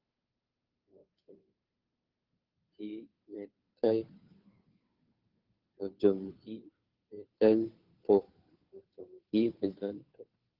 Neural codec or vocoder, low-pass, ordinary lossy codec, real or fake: codec, 16 kHz, 2 kbps, FunCodec, trained on Chinese and English, 25 frames a second; 5.4 kHz; Opus, 32 kbps; fake